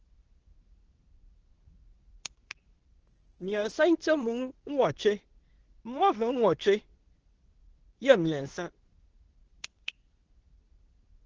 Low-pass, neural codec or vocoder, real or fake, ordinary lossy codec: 7.2 kHz; codec, 24 kHz, 0.9 kbps, WavTokenizer, medium speech release version 2; fake; Opus, 16 kbps